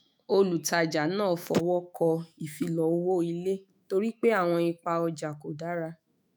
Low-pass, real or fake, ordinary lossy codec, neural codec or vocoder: none; fake; none; autoencoder, 48 kHz, 128 numbers a frame, DAC-VAE, trained on Japanese speech